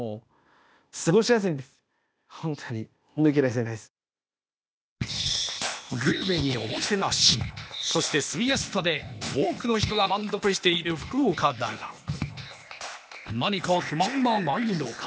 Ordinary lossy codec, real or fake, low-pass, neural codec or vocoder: none; fake; none; codec, 16 kHz, 0.8 kbps, ZipCodec